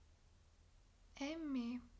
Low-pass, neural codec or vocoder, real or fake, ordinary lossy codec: none; none; real; none